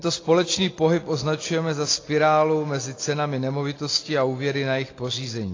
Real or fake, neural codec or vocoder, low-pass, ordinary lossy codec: real; none; 7.2 kHz; AAC, 32 kbps